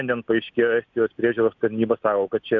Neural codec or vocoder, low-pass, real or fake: none; 7.2 kHz; real